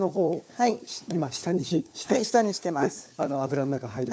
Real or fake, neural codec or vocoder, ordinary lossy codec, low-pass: fake; codec, 16 kHz, 4 kbps, FunCodec, trained on LibriTTS, 50 frames a second; none; none